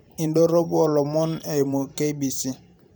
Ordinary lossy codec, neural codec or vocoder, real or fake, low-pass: none; vocoder, 44.1 kHz, 128 mel bands every 512 samples, BigVGAN v2; fake; none